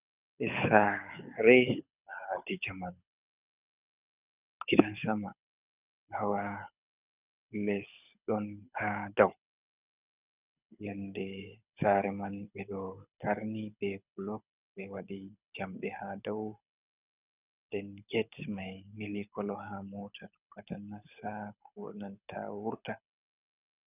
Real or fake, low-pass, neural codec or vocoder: fake; 3.6 kHz; codec, 24 kHz, 6 kbps, HILCodec